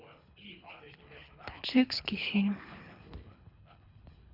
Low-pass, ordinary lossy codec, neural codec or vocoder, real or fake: 5.4 kHz; none; codec, 24 kHz, 3 kbps, HILCodec; fake